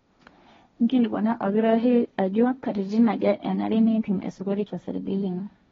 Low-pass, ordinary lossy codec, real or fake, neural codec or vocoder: 7.2 kHz; AAC, 24 kbps; fake; codec, 16 kHz, 1.1 kbps, Voila-Tokenizer